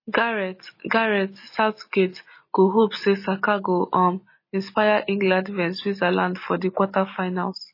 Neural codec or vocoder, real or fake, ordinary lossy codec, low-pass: none; real; MP3, 24 kbps; 5.4 kHz